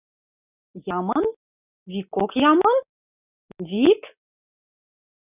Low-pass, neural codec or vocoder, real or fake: 3.6 kHz; none; real